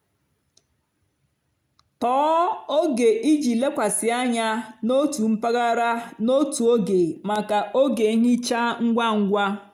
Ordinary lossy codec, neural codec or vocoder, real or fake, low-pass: none; none; real; 19.8 kHz